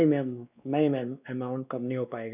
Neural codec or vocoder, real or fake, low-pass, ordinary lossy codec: codec, 16 kHz, 2 kbps, X-Codec, WavLM features, trained on Multilingual LibriSpeech; fake; 3.6 kHz; none